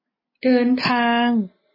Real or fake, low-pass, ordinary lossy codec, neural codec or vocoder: real; 5.4 kHz; MP3, 24 kbps; none